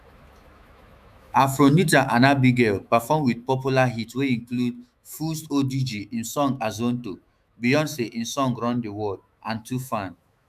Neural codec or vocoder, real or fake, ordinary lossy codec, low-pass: autoencoder, 48 kHz, 128 numbers a frame, DAC-VAE, trained on Japanese speech; fake; none; 14.4 kHz